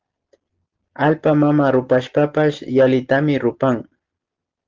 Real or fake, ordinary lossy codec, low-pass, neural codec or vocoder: real; Opus, 16 kbps; 7.2 kHz; none